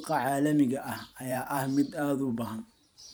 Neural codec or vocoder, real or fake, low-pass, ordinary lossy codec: vocoder, 44.1 kHz, 128 mel bands every 512 samples, BigVGAN v2; fake; none; none